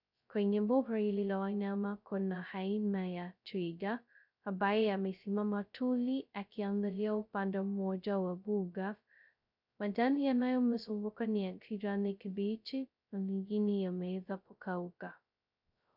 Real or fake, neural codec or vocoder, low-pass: fake; codec, 16 kHz, 0.2 kbps, FocalCodec; 5.4 kHz